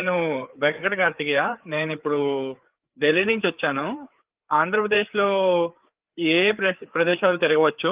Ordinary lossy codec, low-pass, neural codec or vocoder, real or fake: Opus, 32 kbps; 3.6 kHz; codec, 16 kHz, 4 kbps, FreqCodec, larger model; fake